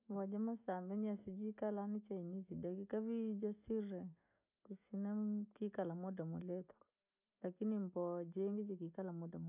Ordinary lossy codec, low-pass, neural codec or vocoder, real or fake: none; 3.6 kHz; none; real